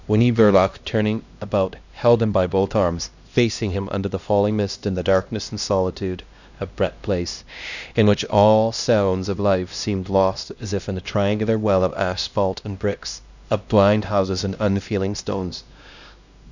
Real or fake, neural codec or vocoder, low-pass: fake; codec, 16 kHz, 1 kbps, X-Codec, HuBERT features, trained on LibriSpeech; 7.2 kHz